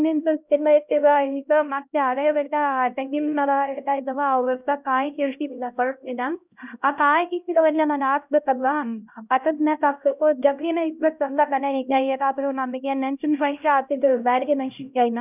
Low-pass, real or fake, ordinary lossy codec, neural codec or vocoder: 3.6 kHz; fake; none; codec, 16 kHz, 0.5 kbps, X-Codec, HuBERT features, trained on LibriSpeech